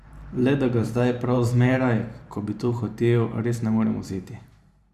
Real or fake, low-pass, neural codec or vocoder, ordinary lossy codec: fake; 14.4 kHz; vocoder, 44.1 kHz, 128 mel bands every 512 samples, BigVGAN v2; Opus, 64 kbps